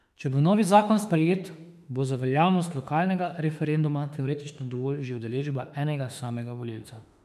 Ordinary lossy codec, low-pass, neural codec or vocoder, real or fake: none; 14.4 kHz; autoencoder, 48 kHz, 32 numbers a frame, DAC-VAE, trained on Japanese speech; fake